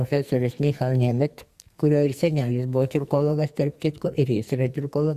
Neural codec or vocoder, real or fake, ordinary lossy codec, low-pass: codec, 44.1 kHz, 2.6 kbps, SNAC; fake; Opus, 64 kbps; 14.4 kHz